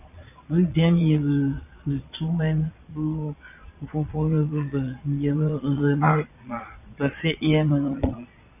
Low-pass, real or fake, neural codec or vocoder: 3.6 kHz; fake; vocoder, 22.05 kHz, 80 mel bands, Vocos